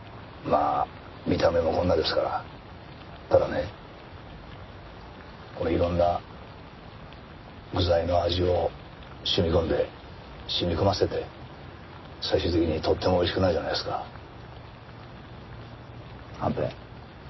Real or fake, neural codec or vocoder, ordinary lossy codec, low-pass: real; none; MP3, 24 kbps; 7.2 kHz